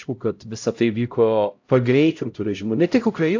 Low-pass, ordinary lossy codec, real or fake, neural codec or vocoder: 7.2 kHz; AAC, 48 kbps; fake; codec, 16 kHz, 0.5 kbps, X-Codec, HuBERT features, trained on LibriSpeech